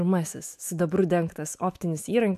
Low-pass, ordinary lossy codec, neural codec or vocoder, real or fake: 14.4 kHz; AAC, 96 kbps; autoencoder, 48 kHz, 128 numbers a frame, DAC-VAE, trained on Japanese speech; fake